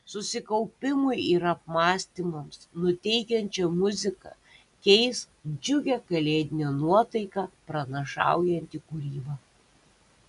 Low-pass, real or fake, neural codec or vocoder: 10.8 kHz; real; none